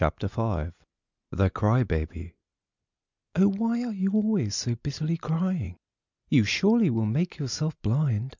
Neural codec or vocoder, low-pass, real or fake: none; 7.2 kHz; real